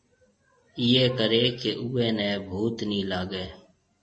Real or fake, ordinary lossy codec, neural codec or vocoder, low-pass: real; MP3, 32 kbps; none; 9.9 kHz